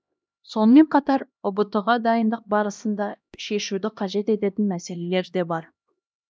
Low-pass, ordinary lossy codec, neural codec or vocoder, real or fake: none; none; codec, 16 kHz, 1 kbps, X-Codec, HuBERT features, trained on LibriSpeech; fake